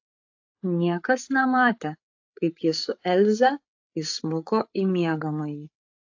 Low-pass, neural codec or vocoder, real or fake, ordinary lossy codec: 7.2 kHz; codec, 16 kHz, 8 kbps, FreqCodec, larger model; fake; AAC, 48 kbps